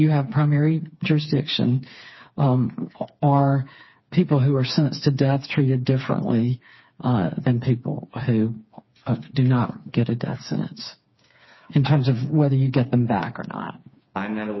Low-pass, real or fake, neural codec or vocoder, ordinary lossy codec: 7.2 kHz; fake; codec, 16 kHz, 4 kbps, FreqCodec, smaller model; MP3, 24 kbps